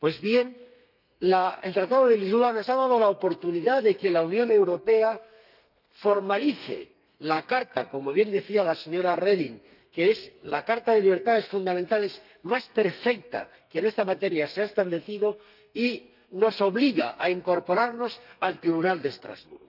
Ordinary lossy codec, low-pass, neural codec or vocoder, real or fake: none; 5.4 kHz; codec, 32 kHz, 1.9 kbps, SNAC; fake